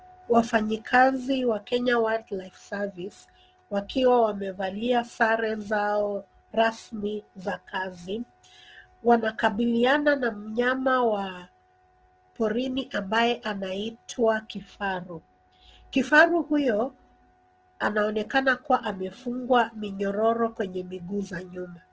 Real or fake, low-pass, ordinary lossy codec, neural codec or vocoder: real; 7.2 kHz; Opus, 16 kbps; none